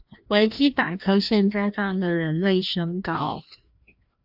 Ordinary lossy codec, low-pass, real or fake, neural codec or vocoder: AAC, 48 kbps; 5.4 kHz; fake; codec, 16 kHz, 1 kbps, FreqCodec, larger model